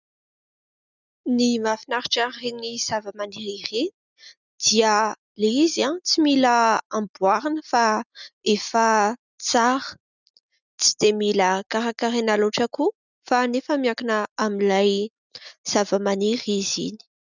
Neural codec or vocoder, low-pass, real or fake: none; 7.2 kHz; real